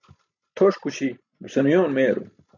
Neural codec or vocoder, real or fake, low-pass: none; real; 7.2 kHz